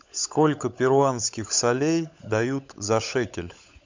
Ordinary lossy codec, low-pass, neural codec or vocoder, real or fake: MP3, 64 kbps; 7.2 kHz; codec, 16 kHz, 16 kbps, FunCodec, trained on LibriTTS, 50 frames a second; fake